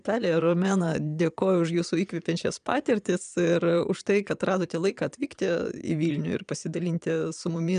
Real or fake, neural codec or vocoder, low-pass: fake; vocoder, 22.05 kHz, 80 mel bands, Vocos; 9.9 kHz